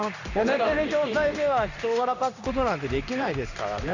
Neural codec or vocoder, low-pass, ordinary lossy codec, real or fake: codec, 16 kHz in and 24 kHz out, 1 kbps, XY-Tokenizer; 7.2 kHz; none; fake